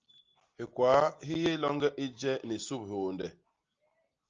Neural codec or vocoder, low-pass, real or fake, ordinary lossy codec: none; 7.2 kHz; real; Opus, 24 kbps